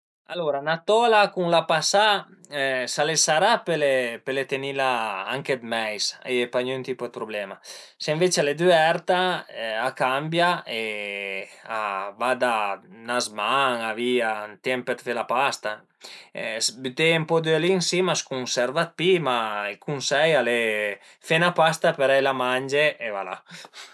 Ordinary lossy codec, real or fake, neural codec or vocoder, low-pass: none; real; none; none